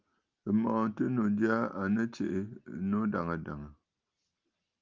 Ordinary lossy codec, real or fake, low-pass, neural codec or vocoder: Opus, 24 kbps; real; 7.2 kHz; none